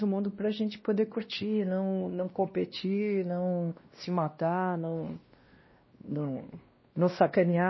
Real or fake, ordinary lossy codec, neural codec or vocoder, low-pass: fake; MP3, 24 kbps; codec, 16 kHz, 1 kbps, X-Codec, WavLM features, trained on Multilingual LibriSpeech; 7.2 kHz